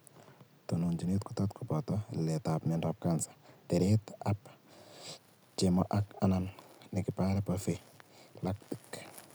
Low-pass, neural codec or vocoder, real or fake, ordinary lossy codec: none; none; real; none